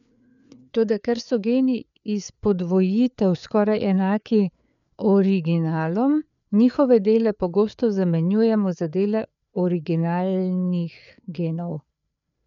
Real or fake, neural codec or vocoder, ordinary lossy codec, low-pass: fake; codec, 16 kHz, 4 kbps, FreqCodec, larger model; none; 7.2 kHz